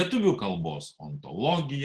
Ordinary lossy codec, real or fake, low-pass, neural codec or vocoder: Opus, 16 kbps; real; 10.8 kHz; none